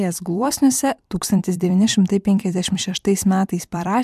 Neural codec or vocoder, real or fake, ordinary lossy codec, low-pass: vocoder, 44.1 kHz, 128 mel bands every 256 samples, BigVGAN v2; fake; MP3, 96 kbps; 14.4 kHz